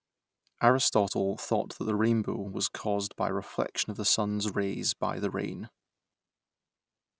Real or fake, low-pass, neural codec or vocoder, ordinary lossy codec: real; none; none; none